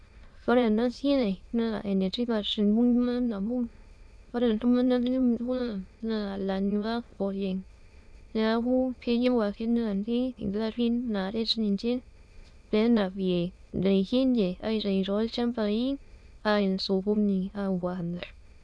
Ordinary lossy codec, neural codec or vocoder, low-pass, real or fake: none; autoencoder, 22.05 kHz, a latent of 192 numbers a frame, VITS, trained on many speakers; none; fake